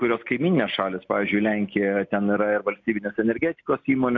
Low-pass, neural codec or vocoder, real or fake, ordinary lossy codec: 7.2 kHz; none; real; MP3, 64 kbps